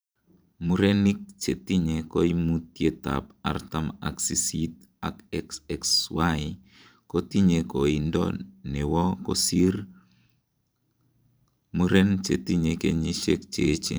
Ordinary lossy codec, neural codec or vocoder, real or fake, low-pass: none; vocoder, 44.1 kHz, 128 mel bands every 512 samples, BigVGAN v2; fake; none